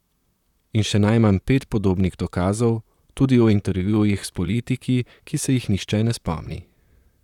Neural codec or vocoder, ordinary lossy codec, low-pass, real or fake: vocoder, 44.1 kHz, 128 mel bands, Pupu-Vocoder; none; 19.8 kHz; fake